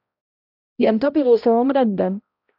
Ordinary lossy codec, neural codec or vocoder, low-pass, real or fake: AAC, 48 kbps; codec, 16 kHz, 0.5 kbps, X-Codec, HuBERT features, trained on balanced general audio; 5.4 kHz; fake